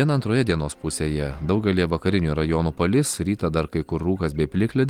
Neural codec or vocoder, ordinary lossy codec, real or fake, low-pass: none; Opus, 24 kbps; real; 19.8 kHz